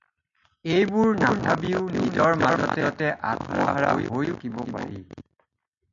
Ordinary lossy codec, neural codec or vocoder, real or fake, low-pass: MP3, 64 kbps; none; real; 7.2 kHz